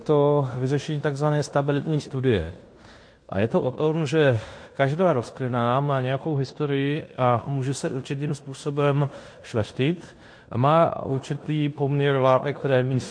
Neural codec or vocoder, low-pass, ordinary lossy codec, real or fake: codec, 16 kHz in and 24 kHz out, 0.9 kbps, LongCat-Audio-Codec, fine tuned four codebook decoder; 9.9 kHz; MP3, 48 kbps; fake